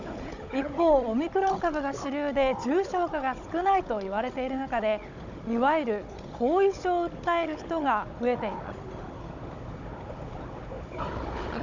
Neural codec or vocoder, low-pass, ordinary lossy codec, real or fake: codec, 16 kHz, 16 kbps, FunCodec, trained on Chinese and English, 50 frames a second; 7.2 kHz; none; fake